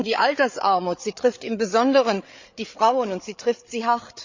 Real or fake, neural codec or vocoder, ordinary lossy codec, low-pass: fake; codec, 16 kHz, 8 kbps, FreqCodec, larger model; Opus, 64 kbps; 7.2 kHz